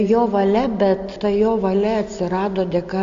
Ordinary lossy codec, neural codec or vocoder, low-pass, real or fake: AAC, 64 kbps; none; 7.2 kHz; real